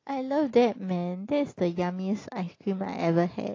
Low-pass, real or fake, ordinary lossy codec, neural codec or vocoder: 7.2 kHz; real; AAC, 32 kbps; none